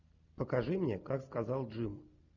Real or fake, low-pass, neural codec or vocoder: real; 7.2 kHz; none